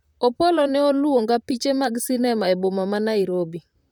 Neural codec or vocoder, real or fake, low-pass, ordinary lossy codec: vocoder, 44.1 kHz, 128 mel bands, Pupu-Vocoder; fake; 19.8 kHz; none